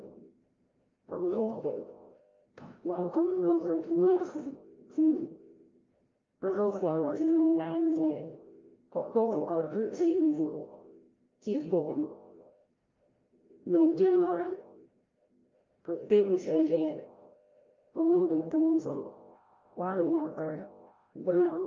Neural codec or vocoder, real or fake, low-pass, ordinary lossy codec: codec, 16 kHz, 0.5 kbps, FreqCodec, larger model; fake; 7.2 kHz; Opus, 32 kbps